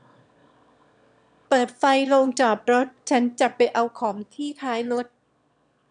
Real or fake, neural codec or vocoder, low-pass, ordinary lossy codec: fake; autoencoder, 22.05 kHz, a latent of 192 numbers a frame, VITS, trained on one speaker; 9.9 kHz; none